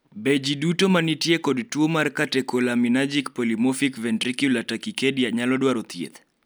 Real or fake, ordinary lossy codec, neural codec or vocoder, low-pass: real; none; none; none